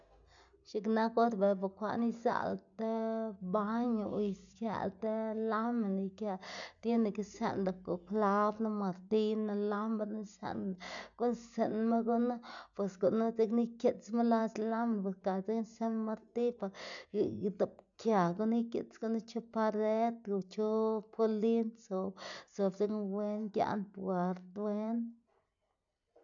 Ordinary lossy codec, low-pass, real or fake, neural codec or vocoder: none; 7.2 kHz; real; none